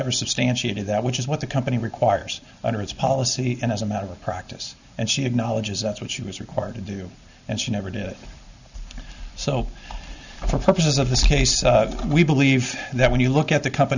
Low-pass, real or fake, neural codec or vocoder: 7.2 kHz; real; none